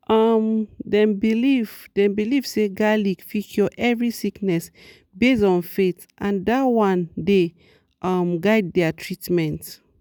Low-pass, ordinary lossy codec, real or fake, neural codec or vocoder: none; none; real; none